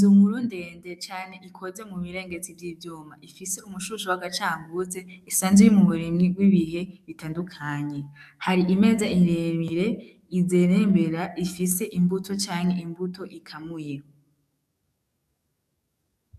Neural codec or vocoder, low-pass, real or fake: codec, 44.1 kHz, 7.8 kbps, DAC; 14.4 kHz; fake